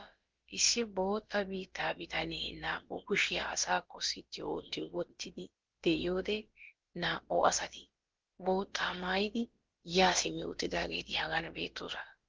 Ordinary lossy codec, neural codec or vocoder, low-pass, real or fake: Opus, 16 kbps; codec, 16 kHz, about 1 kbps, DyCAST, with the encoder's durations; 7.2 kHz; fake